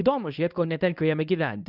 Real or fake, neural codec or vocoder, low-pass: fake; codec, 24 kHz, 0.9 kbps, WavTokenizer, medium speech release version 1; 5.4 kHz